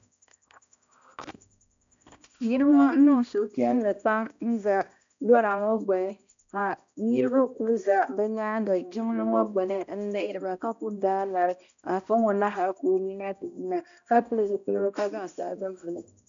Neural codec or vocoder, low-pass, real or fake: codec, 16 kHz, 1 kbps, X-Codec, HuBERT features, trained on balanced general audio; 7.2 kHz; fake